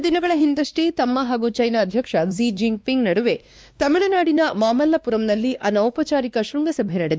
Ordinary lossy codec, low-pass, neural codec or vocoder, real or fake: none; none; codec, 16 kHz, 2 kbps, X-Codec, WavLM features, trained on Multilingual LibriSpeech; fake